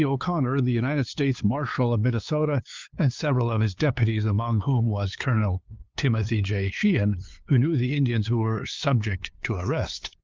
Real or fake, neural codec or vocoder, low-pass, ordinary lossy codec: fake; codec, 16 kHz, 2 kbps, FunCodec, trained on LibriTTS, 25 frames a second; 7.2 kHz; Opus, 32 kbps